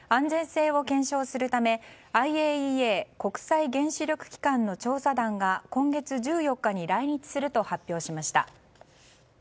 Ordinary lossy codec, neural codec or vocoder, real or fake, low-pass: none; none; real; none